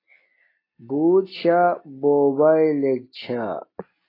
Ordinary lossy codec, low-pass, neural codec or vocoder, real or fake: AAC, 24 kbps; 5.4 kHz; none; real